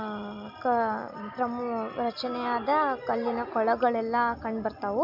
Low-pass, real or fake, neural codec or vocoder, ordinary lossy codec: 5.4 kHz; real; none; MP3, 48 kbps